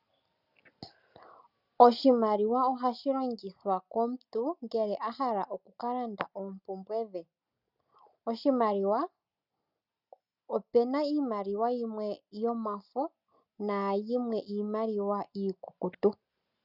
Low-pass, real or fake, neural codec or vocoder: 5.4 kHz; real; none